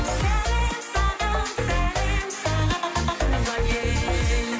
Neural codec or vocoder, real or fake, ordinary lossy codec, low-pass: none; real; none; none